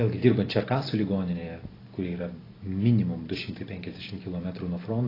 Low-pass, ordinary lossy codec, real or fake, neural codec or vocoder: 5.4 kHz; AAC, 24 kbps; real; none